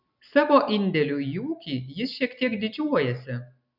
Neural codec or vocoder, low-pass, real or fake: none; 5.4 kHz; real